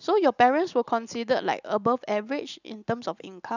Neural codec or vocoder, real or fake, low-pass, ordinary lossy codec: none; real; 7.2 kHz; none